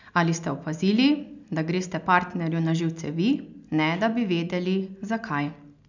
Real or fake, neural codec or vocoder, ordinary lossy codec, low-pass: real; none; none; 7.2 kHz